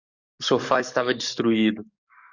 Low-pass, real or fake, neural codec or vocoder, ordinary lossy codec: 7.2 kHz; fake; codec, 44.1 kHz, 7.8 kbps, DAC; Opus, 64 kbps